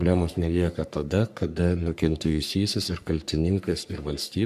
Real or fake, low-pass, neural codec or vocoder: fake; 14.4 kHz; codec, 44.1 kHz, 3.4 kbps, Pupu-Codec